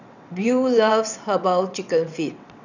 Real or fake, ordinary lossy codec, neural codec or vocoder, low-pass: fake; none; vocoder, 22.05 kHz, 80 mel bands, WaveNeXt; 7.2 kHz